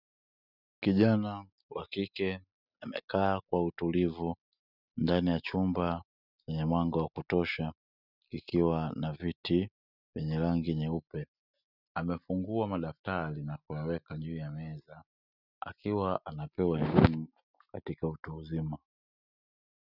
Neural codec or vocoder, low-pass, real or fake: none; 5.4 kHz; real